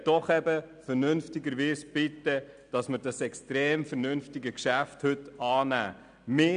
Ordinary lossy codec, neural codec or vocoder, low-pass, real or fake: none; none; 9.9 kHz; real